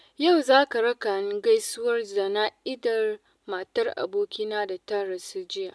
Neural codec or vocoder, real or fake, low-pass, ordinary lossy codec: none; real; none; none